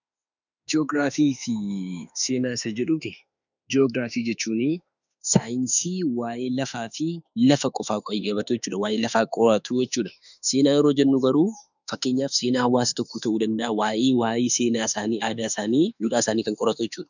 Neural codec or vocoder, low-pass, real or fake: autoencoder, 48 kHz, 32 numbers a frame, DAC-VAE, trained on Japanese speech; 7.2 kHz; fake